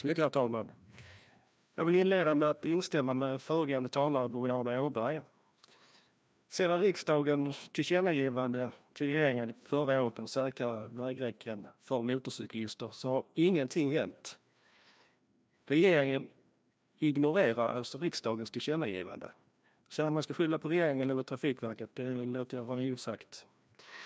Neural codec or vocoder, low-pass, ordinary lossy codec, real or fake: codec, 16 kHz, 1 kbps, FreqCodec, larger model; none; none; fake